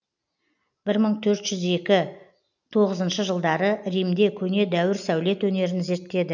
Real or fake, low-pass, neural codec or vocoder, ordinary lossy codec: real; 7.2 kHz; none; none